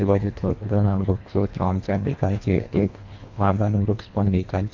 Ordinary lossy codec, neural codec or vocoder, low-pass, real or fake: MP3, 48 kbps; codec, 24 kHz, 1.5 kbps, HILCodec; 7.2 kHz; fake